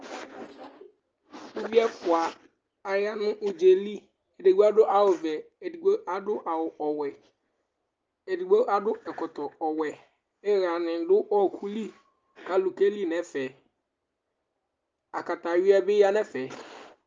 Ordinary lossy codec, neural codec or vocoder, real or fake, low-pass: Opus, 24 kbps; none; real; 7.2 kHz